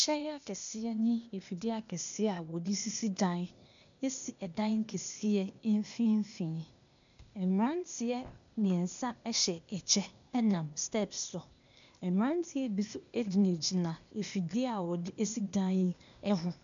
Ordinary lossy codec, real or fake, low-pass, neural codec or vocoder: MP3, 96 kbps; fake; 7.2 kHz; codec, 16 kHz, 0.8 kbps, ZipCodec